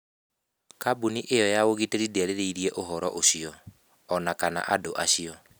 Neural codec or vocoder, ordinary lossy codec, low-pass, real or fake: none; none; none; real